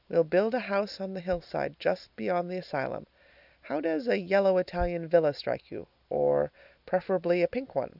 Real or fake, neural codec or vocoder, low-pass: real; none; 5.4 kHz